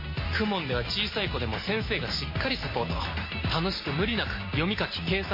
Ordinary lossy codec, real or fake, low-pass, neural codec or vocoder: MP3, 24 kbps; real; 5.4 kHz; none